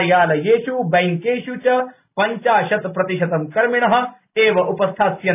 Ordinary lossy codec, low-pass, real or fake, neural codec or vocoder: none; 3.6 kHz; real; none